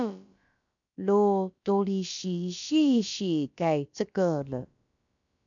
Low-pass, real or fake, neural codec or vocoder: 7.2 kHz; fake; codec, 16 kHz, about 1 kbps, DyCAST, with the encoder's durations